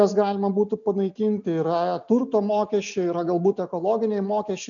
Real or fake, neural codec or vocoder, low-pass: real; none; 7.2 kHz